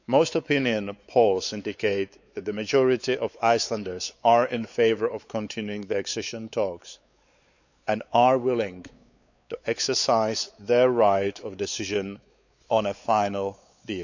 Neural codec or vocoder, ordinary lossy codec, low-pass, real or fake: codec, 16 kHz, 4 kbps, X-Codec, WavLM features, trained on Multilingual LibriSpeech; none; 7.2 kHz; fake